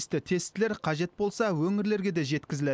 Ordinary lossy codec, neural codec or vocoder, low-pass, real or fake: none; none; none; real